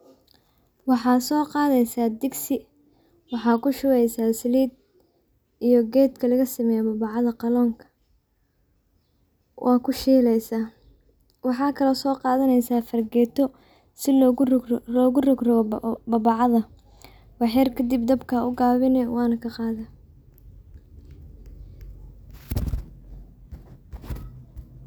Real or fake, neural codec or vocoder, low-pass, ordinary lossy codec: real; none; none; none